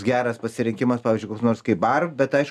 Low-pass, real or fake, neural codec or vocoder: 14.4 kHz; real; none